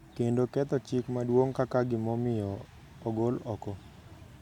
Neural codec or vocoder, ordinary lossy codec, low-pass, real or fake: none; none; 19.8 kHz; real